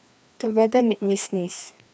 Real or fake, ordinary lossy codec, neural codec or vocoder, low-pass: fake; none; codec, 16 kHz, 2 kbps, FreqCodec, larger model; none